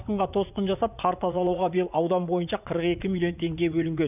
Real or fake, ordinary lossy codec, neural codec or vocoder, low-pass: fake; none; vocoder, 44.1 kHz, 80 mel bands, Vocos; 3.6 kHz